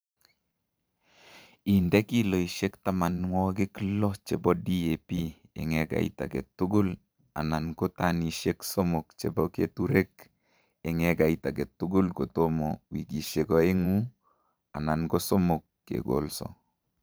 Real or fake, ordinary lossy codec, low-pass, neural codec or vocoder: fake; none; none; vocoder, 44.1 kHz, 128 mel bands every 512 samples, BigVGAN v2